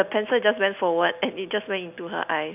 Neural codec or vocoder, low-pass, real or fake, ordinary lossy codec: none; 3.6 kHz; real; none